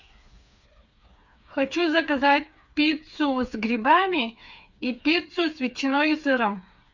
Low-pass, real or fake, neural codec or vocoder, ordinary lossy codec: 7.2 kHz; fake; codec, 16 kHz, 4 kbps, FreqCodec, smaller model; Opus, 64 kbps